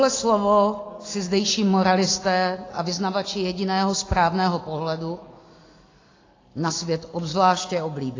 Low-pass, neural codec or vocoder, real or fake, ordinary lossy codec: 7.2 kHz; none; real; AAC, 32 kbps